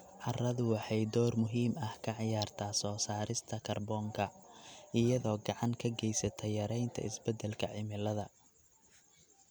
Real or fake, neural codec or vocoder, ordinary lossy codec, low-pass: real; none; none; none